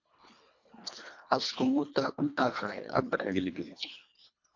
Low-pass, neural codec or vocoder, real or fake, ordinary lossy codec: 7.2 kHz; codec, 24 kHz, 1.5 kbps, HILCodec; fake; MP3, 64 kbps